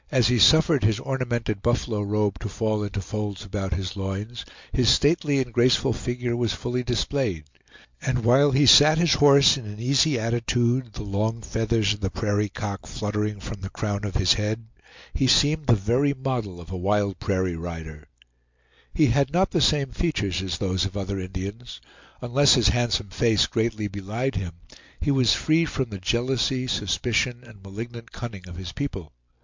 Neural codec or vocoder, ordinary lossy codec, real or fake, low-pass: none; MP3, 64 kbps; real; 7.2 kHz